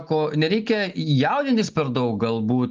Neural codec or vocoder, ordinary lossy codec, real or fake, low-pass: none; Opus, 24 kbps; real; 7.2 kHz